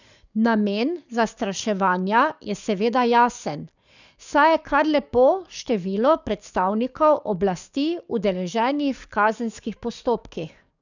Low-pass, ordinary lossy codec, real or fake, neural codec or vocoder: 7.2 kHz; none; fake; codec, 44.1 kHz, 7.8 kbps, DAC